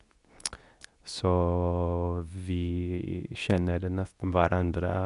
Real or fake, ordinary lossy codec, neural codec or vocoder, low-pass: fake; none; codec, 24 kHz, 0.9 kbps, WavTokenizer, medium speech release version 2; 10.8 kHz